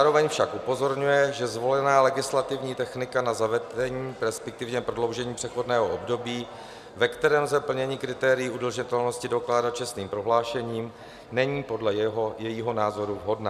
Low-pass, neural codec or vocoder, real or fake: 14.4 kHz; none; real